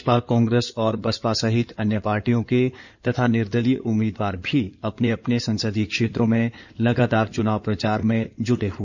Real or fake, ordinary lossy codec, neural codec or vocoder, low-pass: fake; none; codec, 16 kHz in and 24 kHz out, 2.2 kbps, FireRedTTS-2 codec; 7.2 kHz